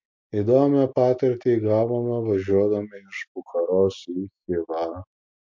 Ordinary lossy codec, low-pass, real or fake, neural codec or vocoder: MP3, 48 kbps; 7.2 kHz; real; none